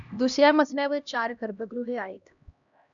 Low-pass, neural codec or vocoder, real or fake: 7.2 kHz; codec, 16 kHz, 1 kbps, X-Codec, HuBERT features, trained on LibriSpeech; fake